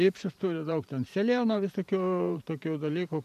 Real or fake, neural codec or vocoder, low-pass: fake; vocoder, 44.1 kHz, 128 mel bands every 512 samples, BigVGAN v2; 14.4 kHz